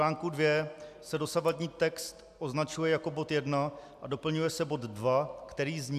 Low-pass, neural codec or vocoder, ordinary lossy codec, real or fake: 14.4 kHz; none; AAC, 96 kbps; real